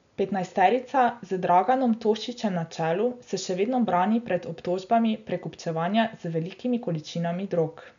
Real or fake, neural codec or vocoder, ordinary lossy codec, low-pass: real; none; none; 7.2 kHz